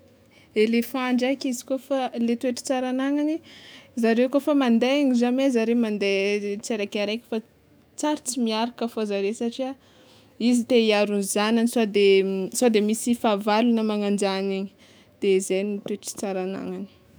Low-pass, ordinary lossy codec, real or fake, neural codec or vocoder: none; none; fake; autoencoder, 48 kHz, 128 numbers a frame, DAC-VAE, trained on Japanese speech